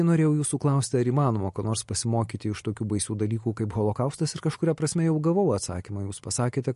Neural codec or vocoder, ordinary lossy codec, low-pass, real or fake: none; MP3, 48 kbps; 14.4 kHz; real